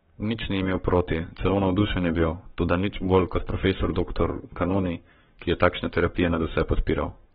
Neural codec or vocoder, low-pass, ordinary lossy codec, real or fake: codec, 44.1 kHz, 7.8 kbps, Pupu-Codec; 19.8 kHz; AAC, 16 kbps; fake